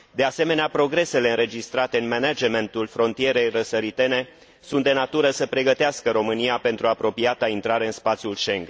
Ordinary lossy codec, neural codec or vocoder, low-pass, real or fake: none; none; none; real